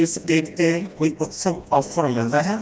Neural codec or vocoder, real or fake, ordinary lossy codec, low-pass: codec, 16 kHz, 1 kbps, FreqCodec, smaller model; fake; none; none